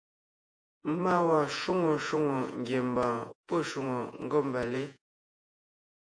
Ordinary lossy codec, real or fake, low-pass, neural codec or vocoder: AAC, 64 kbps; fake; 9.9 kHz; vocoder, 48 kHz, 128 mel bands, Vocos